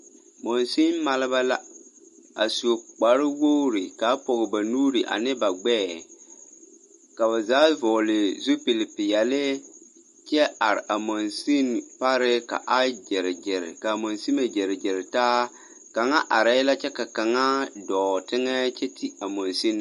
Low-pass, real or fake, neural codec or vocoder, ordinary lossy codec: 14.4 kHz; real; none; MP3, 48 kbps